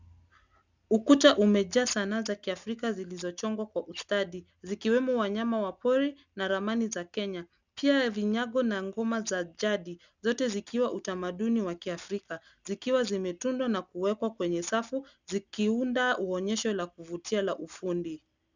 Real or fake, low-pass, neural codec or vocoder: real; 7.2 kHz; none